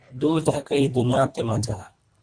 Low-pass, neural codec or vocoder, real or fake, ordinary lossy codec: 9.9 kHz; codec, 24 kHz, 1.5 kbps, HILCodec; fake; Opus, 64 kbps